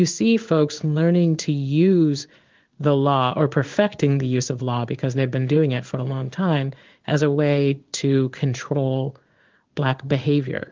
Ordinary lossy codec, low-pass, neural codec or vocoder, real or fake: Opus, 24 kbps; 7.2 kHz; codec, 16 kHz in and 24 kHz out, 1 kbps, XY-Tokenizer; fake